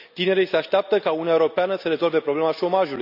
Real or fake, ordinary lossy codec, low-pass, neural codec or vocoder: real; none; 5.4 kHz; none